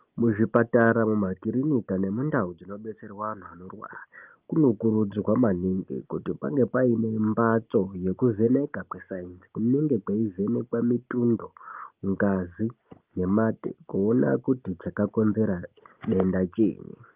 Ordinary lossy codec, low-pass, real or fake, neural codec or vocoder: Opus, 32 kbps; 3.6 kHz; real; none